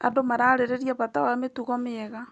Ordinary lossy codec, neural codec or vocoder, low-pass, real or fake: Opus, 64 kbps; none; 10.8 kHz; real